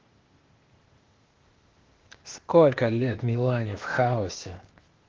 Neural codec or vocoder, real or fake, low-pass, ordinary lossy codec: codec, 16 kHz, 0.8 kbps, ZipCodec; fake; 7.2 kHz; Opus, 16 kbps